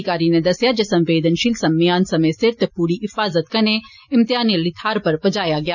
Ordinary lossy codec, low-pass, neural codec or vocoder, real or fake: none; 7.2 kHz; none; real